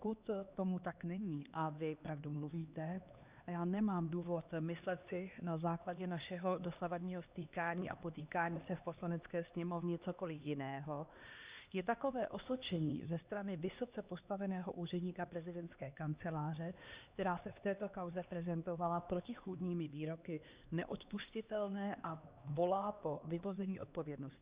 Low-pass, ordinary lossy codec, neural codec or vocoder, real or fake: 3.6 kHz; Opus, 64 kbps; codec, 16 kHz, 2 kbps, X-Codec, HuBERT features, trained on LibriSpeech; fake